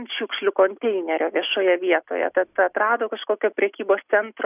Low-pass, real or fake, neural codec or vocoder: 3.6 kHz; real; none